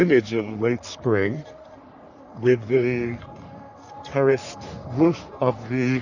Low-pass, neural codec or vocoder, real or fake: 7.2 kHz; codec, 44.1 kHz, 3.4 kbps, Pupu-Codec; fake